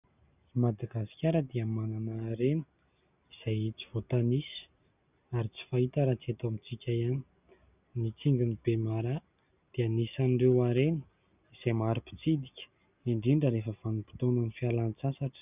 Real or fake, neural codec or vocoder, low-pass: real; none; 3.6 kHz